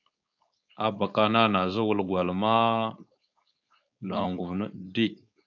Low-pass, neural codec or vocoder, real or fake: 7.2 kHz; codec, 16 kHz, 4.8 kbps, FACodec; fake